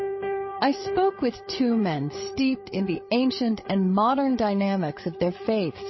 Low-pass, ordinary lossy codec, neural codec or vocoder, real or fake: 7.2 kHz; MP3, 24 kbps; codec, 16 kHz, 8 kbps, FreqCodec, larger model; fake